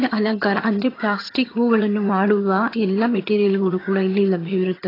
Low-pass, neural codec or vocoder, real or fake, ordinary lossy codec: 5.4 kHz; vocoder, 22.05 kHz, 80 mel bands, HiFi-GAN; fake; AAC, 24 kbps